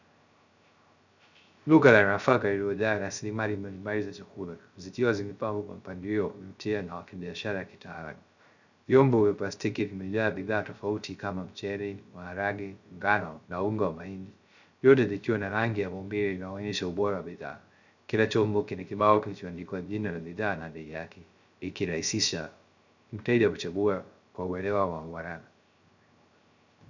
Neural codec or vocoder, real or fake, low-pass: codec, 16 kHz, 0.3 kbps, FocalCodec; fake; 7.2 kHz